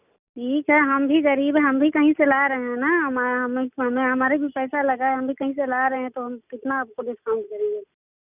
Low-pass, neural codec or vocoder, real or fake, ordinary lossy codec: 3.6 kHz; none; real; none